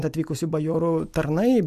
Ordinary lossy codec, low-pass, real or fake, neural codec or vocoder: MP3, 96 kbps; 14.4 kHz; real; none